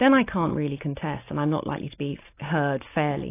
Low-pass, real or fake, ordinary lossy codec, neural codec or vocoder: 3.6 kHz; real; AAC, 24 kbps; none